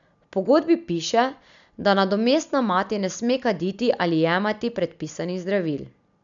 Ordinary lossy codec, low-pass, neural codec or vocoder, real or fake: none; 7.2 kHz; none; real